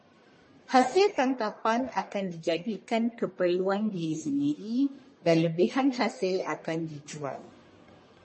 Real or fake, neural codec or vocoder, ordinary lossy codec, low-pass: fake; codec, 44.1 kHz, 1.7 kbps, Pupu-Codec; MP3, 32 kbps; 10.8 kHz